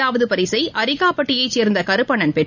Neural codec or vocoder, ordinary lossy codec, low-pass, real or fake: none; none; 7.2 kHz; real